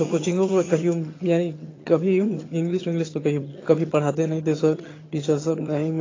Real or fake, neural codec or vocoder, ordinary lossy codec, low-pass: fake; vocoder, 22.05 kHz, 80 mel bands, HiFi-GAN; AAC, 32 kbps; 7.2 kHz